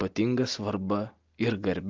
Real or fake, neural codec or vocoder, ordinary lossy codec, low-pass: real; none; Opus, 24 kbps; 7.2 kHz